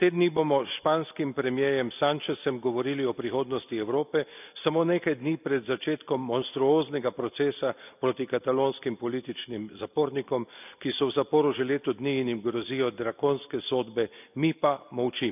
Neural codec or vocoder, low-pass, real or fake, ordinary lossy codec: none; 3.6 kHz; real; none